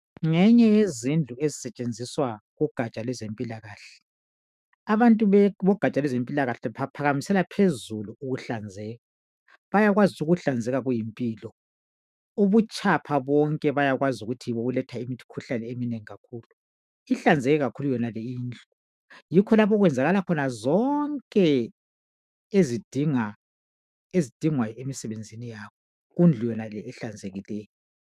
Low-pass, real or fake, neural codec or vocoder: 14.4 kHz; fake; autoencoder, 48 kHz, 128 numbers a frame, DAC-VAE, trained on Japanese speech